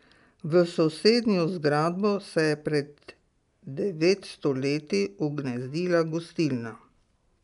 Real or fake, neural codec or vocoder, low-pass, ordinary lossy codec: real; none; 10.8 kHz; MP3, 96 kbps